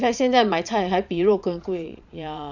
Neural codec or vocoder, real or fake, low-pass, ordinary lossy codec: vocoder, 22.05 kHz, 80 mel bands, Vocos; fake; 7.2 kHz; none